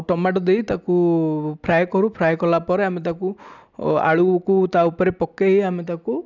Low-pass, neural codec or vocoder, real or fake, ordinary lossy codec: 7.2 kHz; none; real; none